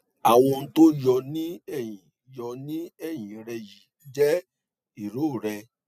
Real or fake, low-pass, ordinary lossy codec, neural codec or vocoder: real; 14.4 kHz; none; none